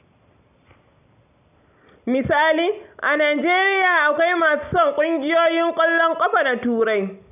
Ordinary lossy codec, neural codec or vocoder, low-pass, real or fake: none; none; 3.6 kHz; real